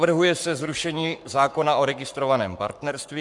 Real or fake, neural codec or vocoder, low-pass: fake; codec, 44.1 kHz, 7.8 kbps, Pupu-Codec; 10.8 kHz